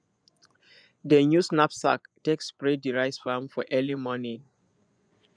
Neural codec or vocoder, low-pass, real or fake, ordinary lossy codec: vocoder, 24 kHz, 100 mel bands, Vocos; 9.9 kHz; fake; none